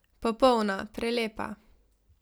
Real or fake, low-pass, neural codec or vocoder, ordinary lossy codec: real; none; none; none